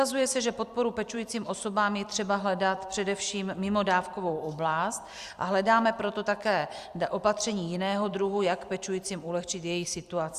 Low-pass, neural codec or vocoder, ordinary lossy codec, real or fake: 14.4 kHz; vocoder, 44.1 kHz, 128 mel bands every 256 samples, BigVGAN v2; Opus, 64 kbps; fake